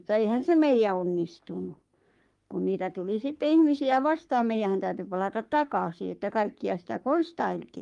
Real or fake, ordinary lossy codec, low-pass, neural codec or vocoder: fake; Opus, 32 kbps; 10.8 kHz; codec, 44.1 kHz, 3.4 kbps, Pupu-Codec